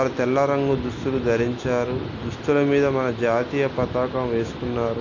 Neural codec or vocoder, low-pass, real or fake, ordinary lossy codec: none; 7.2 kHz; real; MP3, 48 kbps